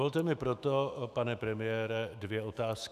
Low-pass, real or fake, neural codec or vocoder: 14.4 kHz; fake; autoencoder, 48 kHz, 128 numbers a frame, DAC-VAE, trained on Japanese speech